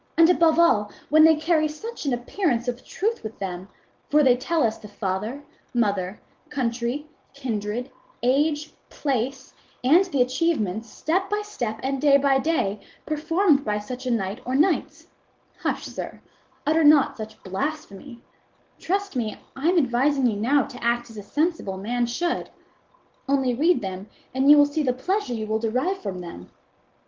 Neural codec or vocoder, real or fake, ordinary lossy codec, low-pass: none; real; Opus, 16 kbps; 7.2 kHz